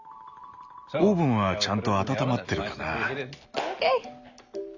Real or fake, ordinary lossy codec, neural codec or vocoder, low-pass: real; none; none; 7.2 kHz